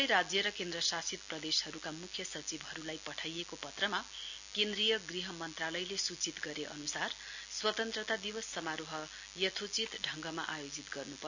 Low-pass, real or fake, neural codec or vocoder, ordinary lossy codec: 7.2 kHz; real; none; none